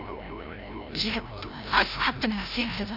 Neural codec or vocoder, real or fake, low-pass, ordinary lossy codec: codec, 16 kHz, 0.5 kbps, FreqCodec, larger model; fake; 5.4 kHz; none